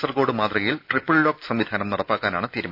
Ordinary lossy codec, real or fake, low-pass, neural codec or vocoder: none; real; 5.4 kHz; none